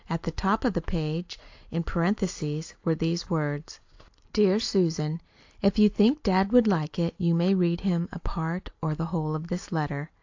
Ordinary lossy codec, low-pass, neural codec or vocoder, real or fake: AAC, 48 kbps; 7.2 kHz; none; real